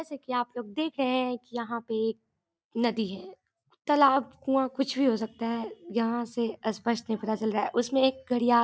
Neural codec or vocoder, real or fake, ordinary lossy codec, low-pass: none; real; none; none